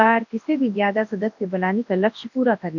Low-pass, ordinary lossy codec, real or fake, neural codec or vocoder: 7.2 kHz; none; fake; codec, 24 kHz, 0.9 kbps, WavTokenizer, large speech release